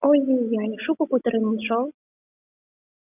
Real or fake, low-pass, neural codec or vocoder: real; 3.6 kHz; none